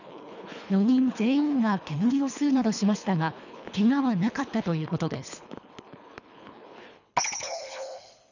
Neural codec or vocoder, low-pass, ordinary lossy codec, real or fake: codec, 24 kHz, 3 kbps, HILCodec; 7.2 kHz; none; fake